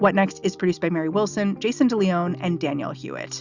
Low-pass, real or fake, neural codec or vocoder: 7.2 kHz; real; none